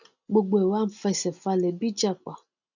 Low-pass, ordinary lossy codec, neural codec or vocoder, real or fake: 7.2 kHz; none; none; real